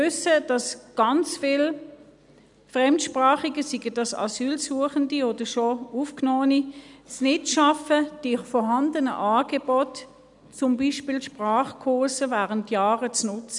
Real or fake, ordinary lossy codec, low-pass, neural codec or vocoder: real; none; 10.8 kHz; none